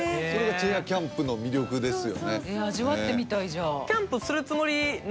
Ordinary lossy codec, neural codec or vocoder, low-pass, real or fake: none; none; none; real